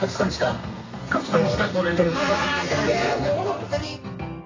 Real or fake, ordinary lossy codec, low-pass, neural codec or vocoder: fake; AAC, 32 kbps; 7.2 kHz; codec, 32 kHz, 1.9 kbps, SNAC